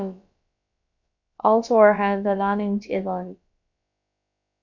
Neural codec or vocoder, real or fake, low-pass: codec, 16 kHz, about 1 kbps, DyCAST, with the encoder's durations; fake; 7.2 kHz